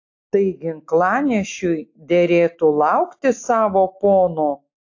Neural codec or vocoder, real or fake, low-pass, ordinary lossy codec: none; real; 7.2 kHz; AAC, 48 kbps